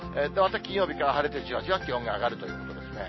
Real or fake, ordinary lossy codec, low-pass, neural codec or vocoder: real; MP3, 24 kbps; 7.2 kHz; none